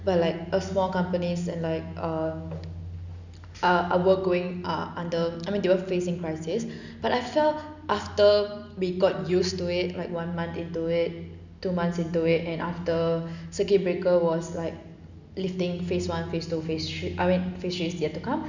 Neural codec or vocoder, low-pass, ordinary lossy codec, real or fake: none; 7.2 kHz; none; real